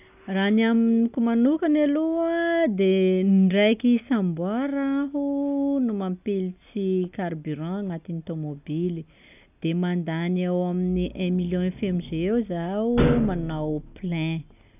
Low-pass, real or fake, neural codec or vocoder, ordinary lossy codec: 3.6 kHz; real; none; none